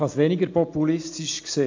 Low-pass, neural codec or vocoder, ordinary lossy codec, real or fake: 7.2 kHz; none; AAC, 48 kbps; real